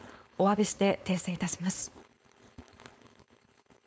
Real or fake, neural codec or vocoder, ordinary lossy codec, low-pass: fake; codec, 16 kHz, 4.8 kbps, FACodec; none; none